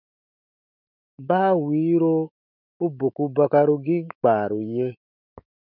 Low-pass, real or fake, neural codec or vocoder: 5.4 kHz; fake; autoencoder, 48 kHz, 128 numbers a frame, DAC-VAE, trained on Japanese speech